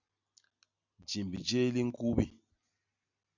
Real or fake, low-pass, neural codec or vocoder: real; 7.2 kHz; none